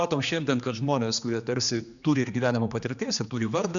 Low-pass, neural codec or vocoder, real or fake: 7.2 kHz; codec, 16 kHz, 2 kbps, X-Codec, HuBERT features, trained on general audio; fake